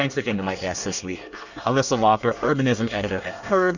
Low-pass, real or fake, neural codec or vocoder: 7.2 kHz; fake; codec, 24 kHz, 1 kbps, SNAC